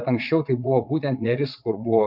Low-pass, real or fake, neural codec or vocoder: 5.4 kHz; fake; vocoder, 22.05 kHz, 80 mel bands, WaveNeXt